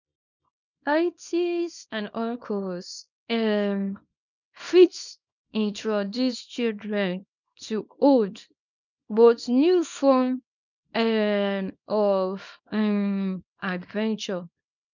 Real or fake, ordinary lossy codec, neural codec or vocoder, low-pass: fake; none; codec, 24 kHz, 0.9 kbps, WavTokenizer, small release; 7.2 kHz